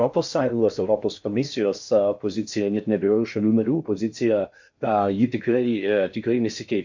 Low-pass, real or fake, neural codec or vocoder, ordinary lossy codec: 7.2 kHz; fake; codec, 16 kHz in and 24 kHz out, 0.6 kbps, FocalCodec, streaming, 4096 codes; MP3, 48 kbps